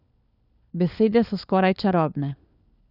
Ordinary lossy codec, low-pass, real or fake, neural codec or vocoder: none; 5.4 kHz; fake; codec, 16 kHz, 4 kbps, FunCodec, trained on LibriTTS, 50 frames a second